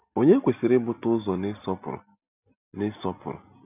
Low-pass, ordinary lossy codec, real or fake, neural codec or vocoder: 3.6 kHz; none; real; none